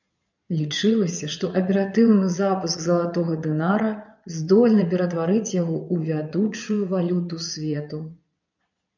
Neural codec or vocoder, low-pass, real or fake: vocoder, 22.05 kHz, 80 mel bands, Vocos; 7.2 kHz; fake